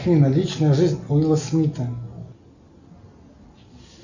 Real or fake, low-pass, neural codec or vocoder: real; 7.2 kHz; none